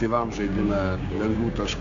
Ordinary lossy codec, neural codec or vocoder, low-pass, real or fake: AAC, 64 kbps; codec, 16 kHz, 6 kbps, DAC; 7.2 kHz; fake